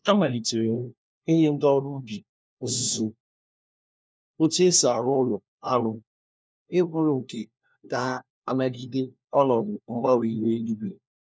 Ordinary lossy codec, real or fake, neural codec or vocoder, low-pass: none; fake; codec, 16 kHz, 1 kbps, FunCodec, trained on LibriTTS, 50 frames a second; none